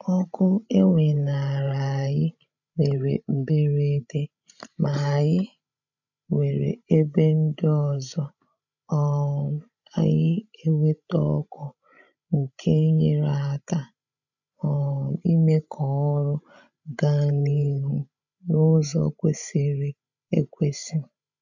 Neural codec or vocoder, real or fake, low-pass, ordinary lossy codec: codec, 16 kHz, 16 kbps, FreqCodec, larger model; fake; 7.2 kHz; none